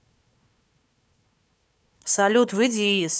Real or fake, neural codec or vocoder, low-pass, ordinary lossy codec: fake; codec, 16 kHz, 4 kbps, FunCodec, trained on Chinese and English, 50 frames a second; none; none